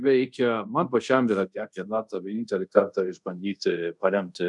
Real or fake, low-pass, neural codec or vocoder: fake; 10.8 kHz; codec, 24 kHz, 0.5 kbps, DualCodec